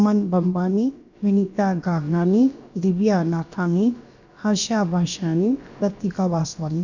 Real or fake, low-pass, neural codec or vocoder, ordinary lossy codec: fake; 7.2 kHz; codec, 16 kHz, about 1 kbps, DyCAST, with the encoder's durations; none